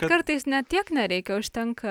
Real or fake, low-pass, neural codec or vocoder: real; 19.8 kHz; none